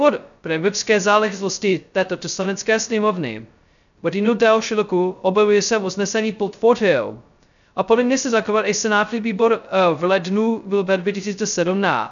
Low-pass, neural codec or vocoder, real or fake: 7.2 kHz; codec, 16 kHz, 0.2 kbps, FocalCodec; fake